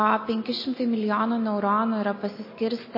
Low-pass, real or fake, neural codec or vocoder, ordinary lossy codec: 5.4 kHz; real; none; MP3, 24 kbps